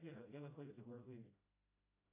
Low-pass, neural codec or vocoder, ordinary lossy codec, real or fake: 3.6 kHz; codec, 16 kHz, 0.5 kbps, FreqCodec, smaller model; AAC, 32 kbps; fake